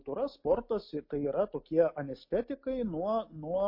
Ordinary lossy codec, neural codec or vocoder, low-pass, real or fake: MP3, 32 kbps; none; 5.4 kHz; real